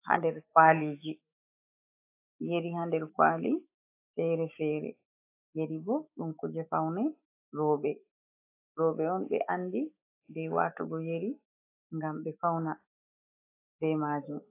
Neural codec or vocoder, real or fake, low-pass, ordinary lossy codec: autoencoder, 48 kHz, 128 numbers a frame, DAC-VAE, trained on Japanese speech; fake; 3.6 kHz; AAC, 24 kbps